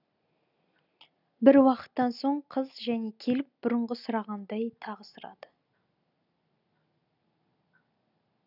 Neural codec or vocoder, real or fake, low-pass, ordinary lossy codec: none; real; 5.4 kHz; none